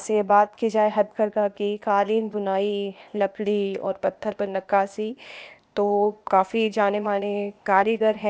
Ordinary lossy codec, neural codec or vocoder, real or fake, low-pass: none; codec, 16 kHz, 0.8 kbps, ZipCodec; fake; none